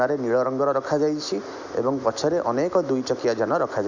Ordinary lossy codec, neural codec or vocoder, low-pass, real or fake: none; none; 7.2 kHz; real